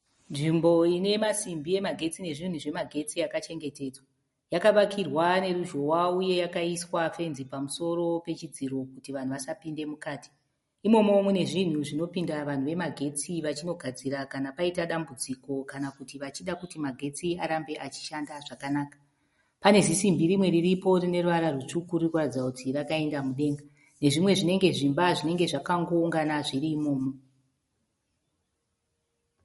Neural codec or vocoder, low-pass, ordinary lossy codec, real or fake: none; 19.8 kHz; MP3, 48 kbps; real